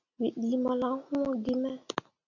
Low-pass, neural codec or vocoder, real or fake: 7.2 kHz; none; real